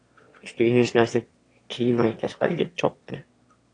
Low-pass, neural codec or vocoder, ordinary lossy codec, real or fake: 9.9 kHz; autoencoder, 22.05 kHz, a latent of 192 numbers a frame, VITS, trained on one speaker; AAC, 48 kbps; fake